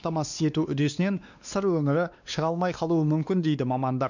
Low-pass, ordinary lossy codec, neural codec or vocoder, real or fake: 7.2 kHz; none; codec, 16 kHz, 2 kbps, X-Codec, WavLM features, trained on Multilingual LibriSpeech; fake